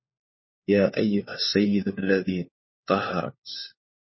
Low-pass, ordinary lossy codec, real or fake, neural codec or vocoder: 7.2 kHz; MP3, 24 kbps; fake; codec, 16 kHz, 4 kbps, FunCodec, trained on LibriTTS, 50 frames a second